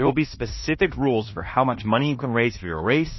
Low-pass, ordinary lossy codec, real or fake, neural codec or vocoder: 7.2 kHz; MP3, 24 kbps; fake; codec, 16 kHz in and 24 kHz out, 0.9 kbps, LongCat-Audio-Codec, fine tuned four codebook decoder